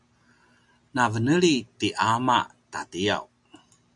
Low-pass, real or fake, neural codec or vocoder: 10.8 kHz; real; none